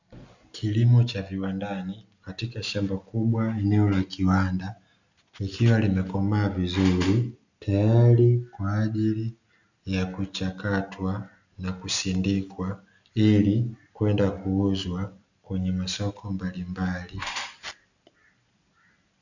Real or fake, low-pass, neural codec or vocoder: real; 7.2 kHz; none